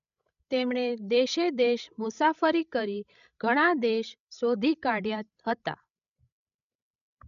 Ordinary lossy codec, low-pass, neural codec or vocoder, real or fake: none; 7.2 kHz; codec, 16 kHz, 16 kbps, FreqCodec, larger model; fake